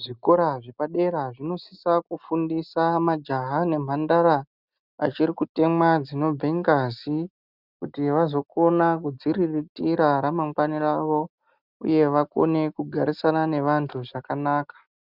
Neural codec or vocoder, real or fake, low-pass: none; real; 5.4 kHz